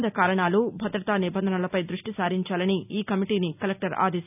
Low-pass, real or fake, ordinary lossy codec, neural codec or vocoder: 3.6 kHz; real; none; none